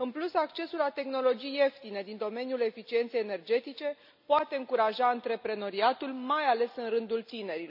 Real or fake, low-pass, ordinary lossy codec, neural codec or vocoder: real; 5.4 kHz; none; none